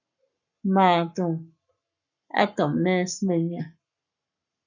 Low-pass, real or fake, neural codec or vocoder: 7.2 kHz; fake; codec, 44.1 kHz, 7.8 kbps, Pupu-Codec